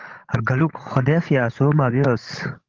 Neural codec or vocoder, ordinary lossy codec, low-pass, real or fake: codec, 16 kHz, 16 kbps, FreqCodec, larger model; Opus, 16 kbps; 7.2 kHz; fake